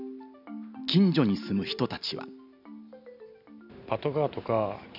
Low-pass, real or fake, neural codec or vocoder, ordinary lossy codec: 5.4 kHz; real; none; none